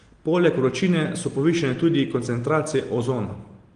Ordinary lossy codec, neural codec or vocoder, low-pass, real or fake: Opus, 24 kbps; none; 9.9 kHz; real